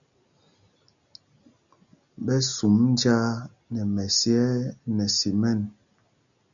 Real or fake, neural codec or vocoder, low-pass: real; none; 7.2 kHz